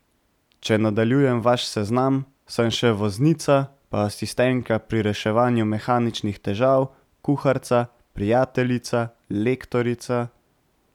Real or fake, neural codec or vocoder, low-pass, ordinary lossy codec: real; none; 19.8 kHz; none